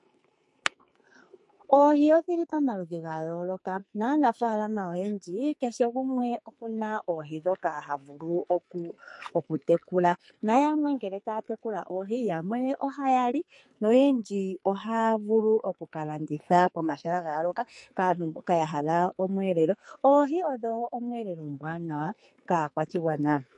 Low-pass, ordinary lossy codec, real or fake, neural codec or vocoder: 10.8 kHz; MP3, 48 kbps; fake; codec, 44.1 kHz, 2.6 kbps, SNAC